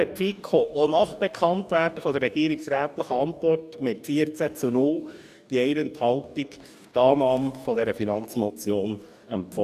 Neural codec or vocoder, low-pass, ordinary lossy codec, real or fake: codec, 44.1 kHz, 2.6 kbps, DAC; 14.4 kHz; none; fake